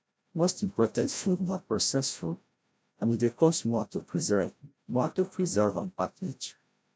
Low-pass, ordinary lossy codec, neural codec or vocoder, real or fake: none; none; codec, 16 kHz, 0.5 kbps, FreqCodec, larger model; fake